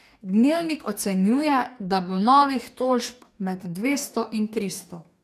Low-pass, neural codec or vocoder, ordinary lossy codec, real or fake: 14.4 kHz; codec, 44.1 kHz, 2.6 kbps, DAC; none; fake